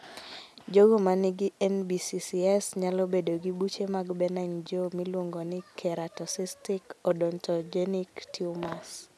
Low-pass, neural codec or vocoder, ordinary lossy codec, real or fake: none; none; none; real